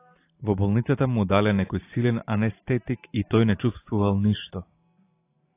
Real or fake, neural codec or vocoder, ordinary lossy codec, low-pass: fake; vocoder, 44.1 kHz, 128 mel bands every 512 samples, BigVGAN v2; AAC, 24 kbps; 3.6 kHz